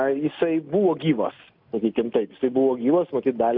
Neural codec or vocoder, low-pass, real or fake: none; 5.4 kHz; real